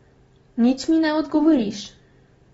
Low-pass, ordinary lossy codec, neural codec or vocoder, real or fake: 10.8 kHz; AAC, 24 kbps; none; real